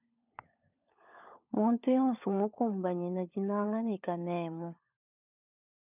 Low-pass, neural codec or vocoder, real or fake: 3.6 kHz; codec, 16 kHz, 16 kbps, FunCodec, trained on LibriTTS, 50 frames a second; fake